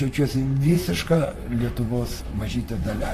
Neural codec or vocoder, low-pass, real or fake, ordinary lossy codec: vocoder, 44.1 kHz, 128 mel bands, Pupu-Vocoder; 14.4 kHz; fake; AAC, 48 kbps